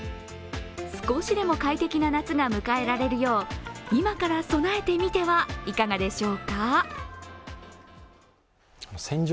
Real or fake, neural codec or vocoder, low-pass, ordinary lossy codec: real; none; none; none